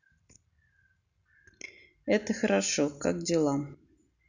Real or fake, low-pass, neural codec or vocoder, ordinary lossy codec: real; 7.2 kHz; none; none